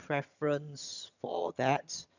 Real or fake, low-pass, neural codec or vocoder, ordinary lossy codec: fake; 7.2 kHz; vocoder, 22.05 kHz, 80 mel bands, HiFi-GAN; none